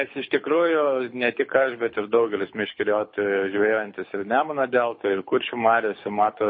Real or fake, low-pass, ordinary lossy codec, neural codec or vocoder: fake; 7.2 kHz; MP3, 24 kbps; codec, 24 kHz, 6 kbps, HILCodec